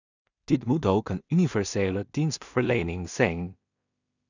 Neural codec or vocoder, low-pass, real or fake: codec, 16 kHz in and 24 kHz out, 0.4 kbps, LongCat-Audio-Codec, two codebook decoder; 7.2 kHz; fake